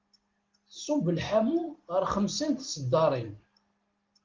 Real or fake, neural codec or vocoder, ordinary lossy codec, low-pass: real; none; Opus, 16 kbps; 7.2 kHz